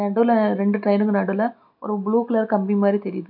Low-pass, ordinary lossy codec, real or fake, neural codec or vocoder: 5.4 kHz; none; real; none